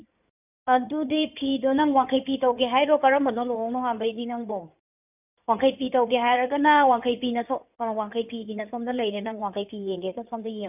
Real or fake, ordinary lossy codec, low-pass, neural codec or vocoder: fake; none; 3.6 kHz; codec, 16 kHz in and 24 kHz out, 2.2 kbps, FireRedTTS-2 codec